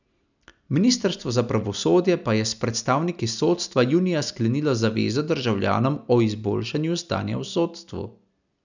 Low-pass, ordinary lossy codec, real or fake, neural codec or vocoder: 7.2 kHz; none; real; none